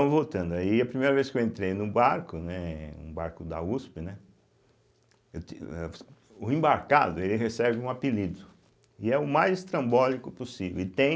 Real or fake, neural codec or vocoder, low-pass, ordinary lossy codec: real; none; none; none